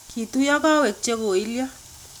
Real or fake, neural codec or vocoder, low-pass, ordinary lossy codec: real; none; none; none